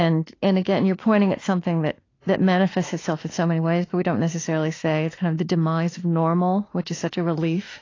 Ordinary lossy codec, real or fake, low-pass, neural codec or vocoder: AAC, 32 kbps; fake; 7.2 kHz; autoencoder, 48 kHz, 32 numbers a frame, DAC-VAE, trained on Japanese speech